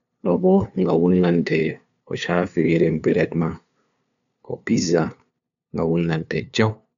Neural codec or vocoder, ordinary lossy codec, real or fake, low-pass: codec, 16 kHz, 2 kbps, FunCodec, trained on LibriTTS, 25 frames a second; none; fake; 7.2 kHz